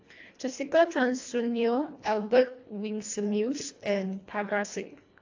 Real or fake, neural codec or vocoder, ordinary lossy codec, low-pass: fake; codec, 24 kHz, 1.5 kbps, HILCodec; AAC, 48 kbps; 7.2 kHz